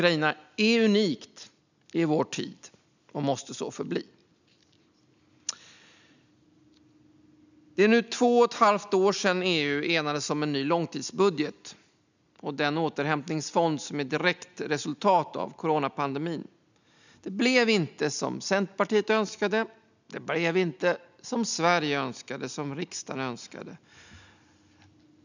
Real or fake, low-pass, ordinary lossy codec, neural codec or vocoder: real; 7.2 kHz; none; none